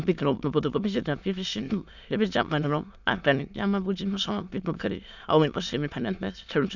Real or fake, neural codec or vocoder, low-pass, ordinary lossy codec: fake; autoencoder, 22.05 kHz, a latent of 192 numbers a frame, VITS, trained on many speakers; 7.2 kHz; none